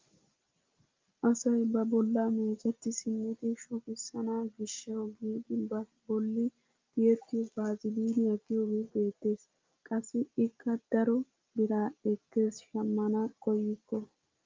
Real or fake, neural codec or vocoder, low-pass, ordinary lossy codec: real; none; 7.2 kHz; Opus, 24 kbps